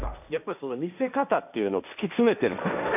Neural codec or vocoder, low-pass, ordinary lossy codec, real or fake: codec, 16 kHz, 1.1 kbps, Voila-Tokenizer; 3.6 kHz; none; fake